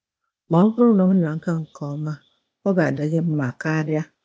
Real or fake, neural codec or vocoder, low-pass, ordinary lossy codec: fake; codec, 16 kHz, 0.8 kbps, ZipCodec; none; none